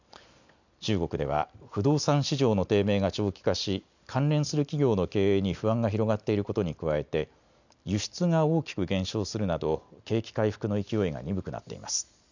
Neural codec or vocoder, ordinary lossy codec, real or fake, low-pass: none; none; real; 7.2 kHz